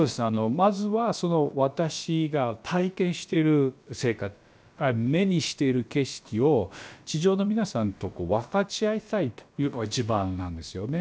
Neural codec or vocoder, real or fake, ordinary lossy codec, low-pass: codec, 16 kHz, about 1 kbps, DyCAST, with the encoder's durations; fake; none; none